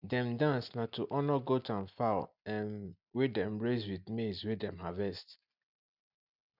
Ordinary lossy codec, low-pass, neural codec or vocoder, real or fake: none; 5.4 kHz; none; real